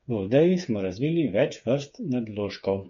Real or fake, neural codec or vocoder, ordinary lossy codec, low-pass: fake; codec, 16 kHz, 8 kbps, FreqCodec, smaller model; MP3, 48 kbps; 7.2 kHz